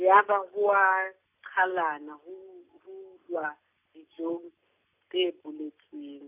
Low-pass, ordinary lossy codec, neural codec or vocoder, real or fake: 3.6 kHz; none; none; real